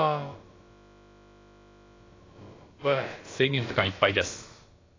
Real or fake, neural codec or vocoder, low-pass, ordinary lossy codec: fake; codec, 16 kHz, about 1 kbps, DyCAST, with the encoder's durations; 7.2 kHz; AAC, 32 kbps